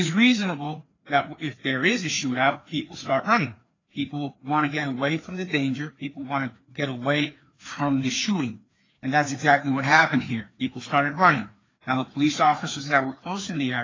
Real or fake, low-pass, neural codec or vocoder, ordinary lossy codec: fake; 7.2 kHz; codec, 16 kHz, 2 kbps, FreqCodec, larger model; AAC, 32 kbps